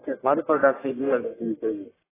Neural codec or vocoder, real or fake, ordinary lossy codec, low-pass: codec, 44.1 kHz, 1.7 kbps, Pupu-Codec; fake; AAC, 16 kbps; 3.6 kHz